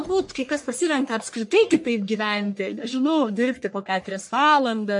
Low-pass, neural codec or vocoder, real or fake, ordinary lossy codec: 10.8 kHz; codec, 44.1 kHz, 1.7 kbps, Pupu-Codec; fake; MP3, 48 kbps